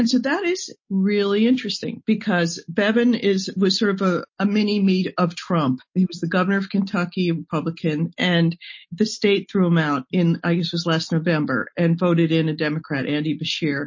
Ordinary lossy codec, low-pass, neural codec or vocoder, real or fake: MP3, 32 kbps; 7.2 kHz; none; real